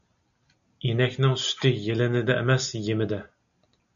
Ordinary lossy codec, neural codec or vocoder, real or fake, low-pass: MP3, 96 kbps; none; real; 7.2 kHz